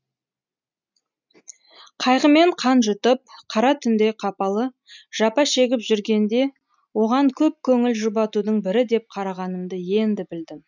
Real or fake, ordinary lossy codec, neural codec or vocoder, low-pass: real; none; none; 7.2 kHz